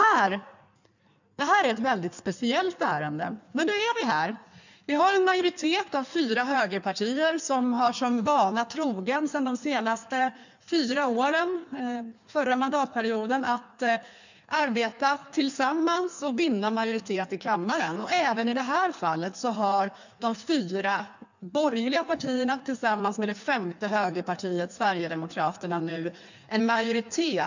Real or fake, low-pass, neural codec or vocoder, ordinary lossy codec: fake; 7.2 kHz; codec, 16 kHz in and 24 kHz out, 1.1 kbps, FireRedTTS-2 codec; none